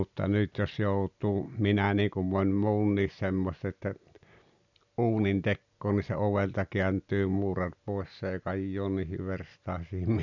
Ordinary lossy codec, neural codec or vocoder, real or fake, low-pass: none; none; real; 7.2 kHz